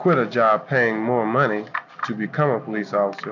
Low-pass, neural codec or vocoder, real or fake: 7.2 kHz; none; real